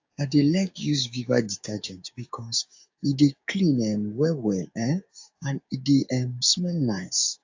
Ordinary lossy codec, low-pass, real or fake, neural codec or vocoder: AAC, 48 kbps; 7.2 kHz; fake; codec, 16 kHz, 6 kbps, DAC